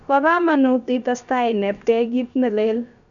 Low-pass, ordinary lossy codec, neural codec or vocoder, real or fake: 7.2 kHz; MP3, 96 kbps; codec, 16 kHz, about 1 kbps, DyCAST, with the encoder's durations; fake